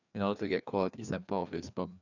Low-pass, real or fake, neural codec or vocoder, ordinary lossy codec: 7.2 kHz; fake; codec, 16 kHz, 2 kbps, FreqCodec, larger model; none